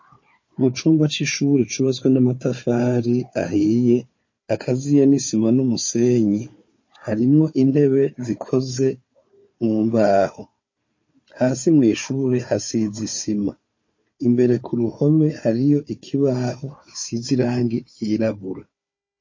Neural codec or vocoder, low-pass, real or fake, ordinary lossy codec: codec, 16 kHz, 4 kbps, FunCodec, trained on Chinese and English, 50 frames a second; 7.2 kHz; fake; MP3, 32 kbps